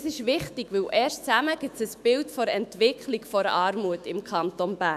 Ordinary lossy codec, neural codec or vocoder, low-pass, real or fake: none; autoencoder, 48 kHz, 128 numbers a frame, DAC-VAE, trained on Japanese speech; 14.4 kHz; fake